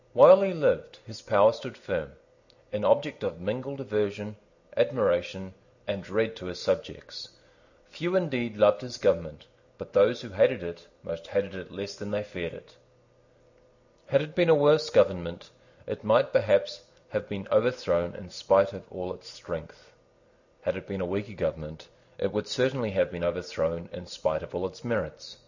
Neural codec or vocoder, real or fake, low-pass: none; real; 7.2 kHz